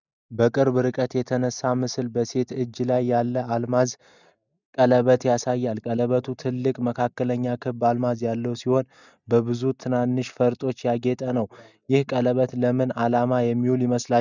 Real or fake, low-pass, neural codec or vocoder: real; 7.2 kHz; none